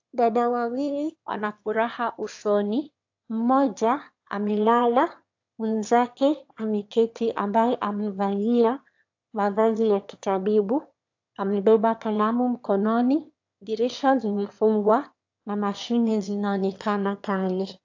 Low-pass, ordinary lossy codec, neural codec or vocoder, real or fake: 7.2 kHz; AAC, 48 kbps; autoencoder, 22.05 kHz, a latent of 192 numbers a frame, VITS, trained on one speaker; fake